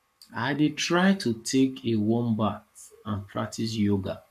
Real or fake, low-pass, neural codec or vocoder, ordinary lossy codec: fake; 14.4 kHz; codec, 44.1 kHz, 7.8 kbps, Pupu-Codec; none